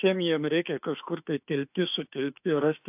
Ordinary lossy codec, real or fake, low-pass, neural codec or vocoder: AAC, 32 kbps; fake; 3.6 kHz; autoencoder, 48 kHz, 32 numbers a frame, DAC-VAE, trained on Japanese speech